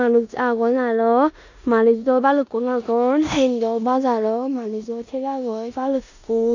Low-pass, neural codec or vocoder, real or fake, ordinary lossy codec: 7.2 kHz; codec, 16 kHz in and 24 kHz out, 0.9 kbps, LongCat-Audio-Codec, four codebook decoder; fake; none